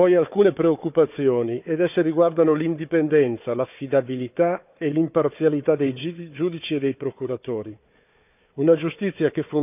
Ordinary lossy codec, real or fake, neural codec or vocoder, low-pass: none; fake; codec, 16 kHz, 4 kbps, FunCodec, trained on Chinese and English, 50 frames a second; 3.6 kHz